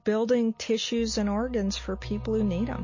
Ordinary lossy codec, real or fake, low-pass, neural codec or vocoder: MP3, 32 kbps; real; 7.2 kHz; none